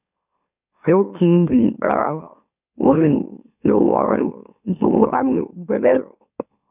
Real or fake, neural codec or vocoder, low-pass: fake; autoencoder, 44.1 kHz, a latent of 192 numbers a frame, MeloTTS; 3.6 kHz